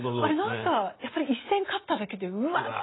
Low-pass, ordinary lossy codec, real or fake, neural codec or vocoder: 7.2 kHz; AAC, 16 kbps; real; none